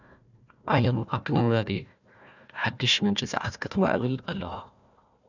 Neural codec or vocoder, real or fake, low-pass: codec, 16 kHz, 1 kbps, FunCodec, trained on Chinese and English, 50 frames a second; fake; 7.2 kHz